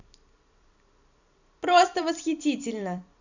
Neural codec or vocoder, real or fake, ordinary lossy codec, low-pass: vocoder, 44.1 kHz, 128 mel bands every 256 samples, BigVGAN v2; fake; none; 7.2 kHz